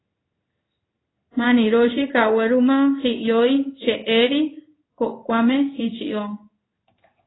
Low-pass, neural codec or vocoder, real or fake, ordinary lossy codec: 7.2 kHz; codec, 16 kHz in and 24 kHz out, 1 kbps, XY-Tokenizer; fake; AAC, 16 kbps